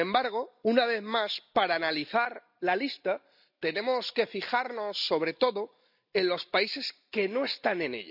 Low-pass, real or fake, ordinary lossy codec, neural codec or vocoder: 5.4 kHz; real; none; none